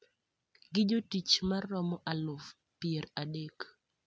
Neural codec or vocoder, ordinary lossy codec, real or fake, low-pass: none; none; real; none